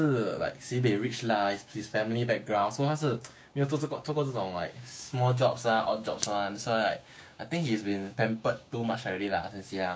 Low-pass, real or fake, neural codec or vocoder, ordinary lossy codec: none; fake; codec, 16 kHz, 6 kbps, DAC; none